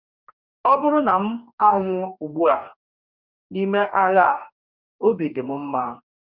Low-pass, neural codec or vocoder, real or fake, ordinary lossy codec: 5.4 kHz; codec, 44.1 kHz, 2.6 kbps, DAC; fake; none